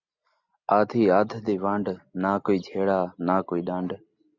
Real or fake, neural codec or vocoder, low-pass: real; none; 7.2 kHz